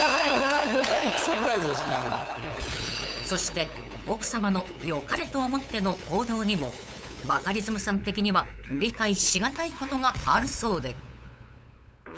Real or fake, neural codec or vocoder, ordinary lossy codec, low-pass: fake; codec, 16 kHz, 8 kbps, FunCodec, trained on LibriTTS, 25 frames a second; none; none